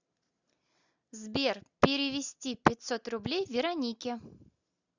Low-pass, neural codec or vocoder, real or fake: 7.2 kHz; none; real